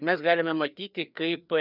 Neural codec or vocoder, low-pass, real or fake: codec, 16 kHz, 2 kbps, FreqCodec, larger model; 5.4 kHz; fake